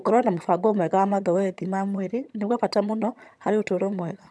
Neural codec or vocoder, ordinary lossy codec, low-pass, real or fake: vocoder, 22.05 kHz, 80 mel bands, HiFi-GAN; none; none; fake